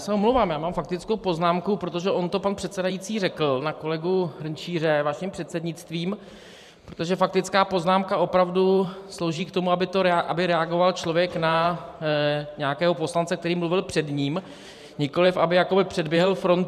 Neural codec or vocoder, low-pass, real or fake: vocoder, 44.1 kHz, 128 mel bands every 512 samples, BigVGAN v2; 14.4 kHz; fake